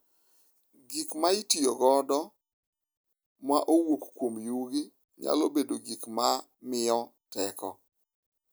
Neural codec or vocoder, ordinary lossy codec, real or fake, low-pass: none; none; real; none